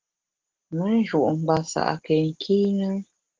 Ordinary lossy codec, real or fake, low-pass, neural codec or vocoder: Opus, 16 kbps; real; 7.2 kHz; none